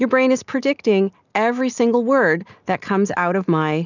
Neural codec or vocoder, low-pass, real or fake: none; 7.2 kHz; real